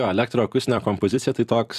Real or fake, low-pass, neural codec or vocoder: fake; 14.4 kHz; vocoder, 44.1 kHz, 128 mel bands every 256 samples, BigVGAN v2